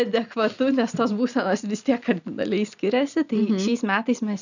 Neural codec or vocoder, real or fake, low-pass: none; real; 7.2 kHz